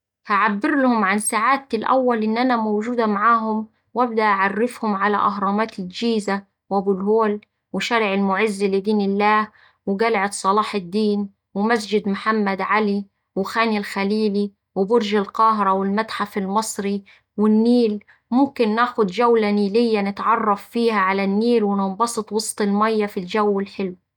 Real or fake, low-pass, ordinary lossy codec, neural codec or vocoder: real; 19.8 kHz; none; none